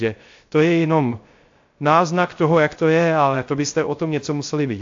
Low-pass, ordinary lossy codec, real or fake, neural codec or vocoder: 7.2 kHz; AAC, 48 kbps; fake; codec, 16 kHz, 0.3 kbps, FocalCodec